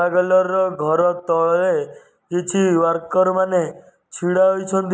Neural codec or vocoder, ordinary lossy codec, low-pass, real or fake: none; none; none; real